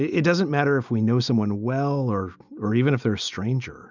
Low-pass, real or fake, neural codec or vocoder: 7.2 kHz; real; none